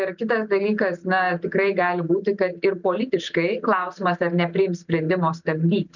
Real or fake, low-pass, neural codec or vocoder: real; 7.2 kHz; none